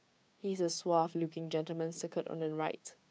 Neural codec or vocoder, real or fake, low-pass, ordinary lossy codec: codec, 16 kHz, 6 kbps, DAC; fake; none; none